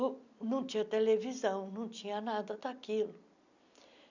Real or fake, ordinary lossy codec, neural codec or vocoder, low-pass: real; none; none; 7.2 kHz